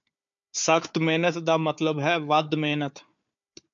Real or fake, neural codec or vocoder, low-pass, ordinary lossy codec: fake; codec, 16 kHz, 4 kbps, FunCodec, trained on Chinese and English, 50 frames a second; 7.2 kHz; MP3, 64 kbps